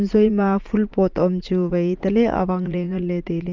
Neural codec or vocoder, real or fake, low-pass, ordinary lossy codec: vocoder, 44.1 kHz, 80 mel bands, Vocos; fake; 7.2 kHz; Opus, 32 kbps